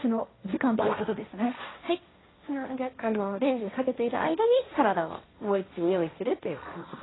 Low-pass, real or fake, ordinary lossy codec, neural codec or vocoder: 7.2 kHz; fake; AAC, 16 kbps; codec, 16 kHz, 1.1 kbps, Voila-Tokenizer